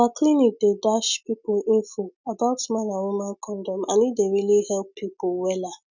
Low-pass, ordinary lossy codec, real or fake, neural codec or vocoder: 7.2 kHz; none; real; none